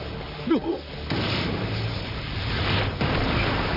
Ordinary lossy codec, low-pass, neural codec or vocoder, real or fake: none; 5.4 kHz; vocoder, 44.1 kHz, 128 mel bands, Pupu-Vocoder; fake